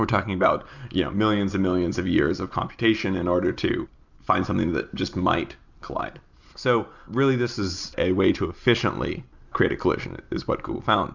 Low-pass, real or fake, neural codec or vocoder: 7.2 kHz; real; none